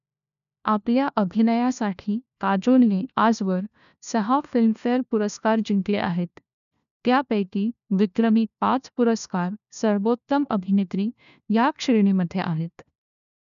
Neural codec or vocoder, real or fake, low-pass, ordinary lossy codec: codec, 16 kHz, 1 kbps, FunCodec, trained on LibriTTS, 50 frames a second; fake; 7.2 kHz; none